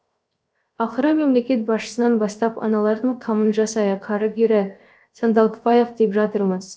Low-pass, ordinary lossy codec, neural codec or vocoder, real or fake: none; none; codec, 16 kHz, 0.7 kbps, FocalCodec; fake